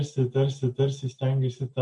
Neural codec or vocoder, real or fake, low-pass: none; real; 10.8 kHz